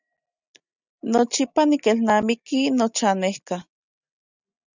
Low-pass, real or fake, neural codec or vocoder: 7.2 kHz; real; none